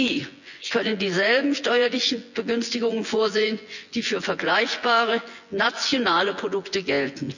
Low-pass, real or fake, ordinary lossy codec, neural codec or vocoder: 7.2 kHz; fake; none; vocoder, 24 kHz, 100 mel bands, Vocos